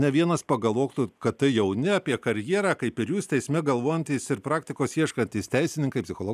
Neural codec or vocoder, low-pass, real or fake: none; 14.4 kHz; real